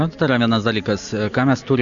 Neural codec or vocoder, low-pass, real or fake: none; 7.2 kHz; real